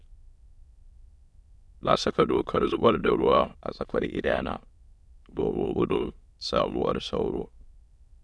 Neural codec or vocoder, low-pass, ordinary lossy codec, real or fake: autoencoder, 22.05 kHz, a latent of 192 numbers a frame, VITS, trained on many speakers; none; none; fake